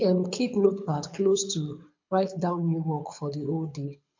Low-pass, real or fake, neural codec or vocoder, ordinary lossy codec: 7.2 kHz; fake; codec, 24 kHz, 6 kbps, HILCodec; MP3, 48 kbps